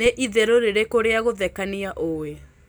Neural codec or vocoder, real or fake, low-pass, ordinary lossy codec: none; real; none; none